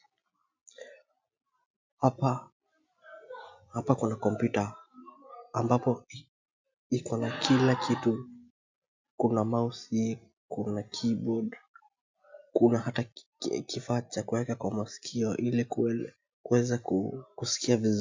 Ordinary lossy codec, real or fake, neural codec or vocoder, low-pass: MP3, 64 kbps; real; none; 7.2 kHz